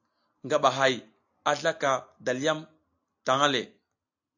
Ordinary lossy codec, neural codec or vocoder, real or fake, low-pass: AAC, 48 kbps; none; real; 7.2 kHz